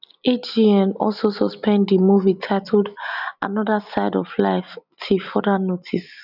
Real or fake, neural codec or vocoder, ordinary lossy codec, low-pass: real; none; none; 5.4 kHz